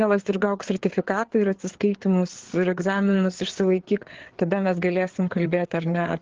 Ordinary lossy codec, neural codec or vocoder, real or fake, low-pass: Opus, 16 kbps; codec, 16 kHz, 4 kbps, FreqCodec, larger model; fake; 7.2 kHz